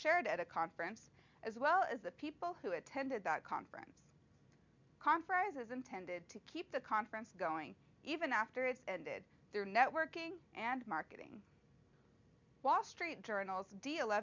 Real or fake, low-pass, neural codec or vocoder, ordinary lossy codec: real; 7.2 kHz; none; MP3, 64 kbps